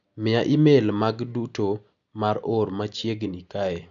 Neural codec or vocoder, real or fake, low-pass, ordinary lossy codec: none; real; 7.2 kHz; none